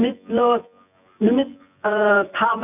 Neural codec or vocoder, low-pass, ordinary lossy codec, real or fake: vocoder, 24 kHz, 100 mel bands, Vocos; 3.6 kHz; none; fake